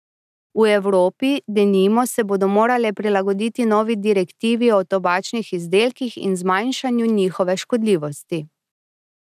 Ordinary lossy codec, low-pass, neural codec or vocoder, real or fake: none; 14.4 kHz; none; real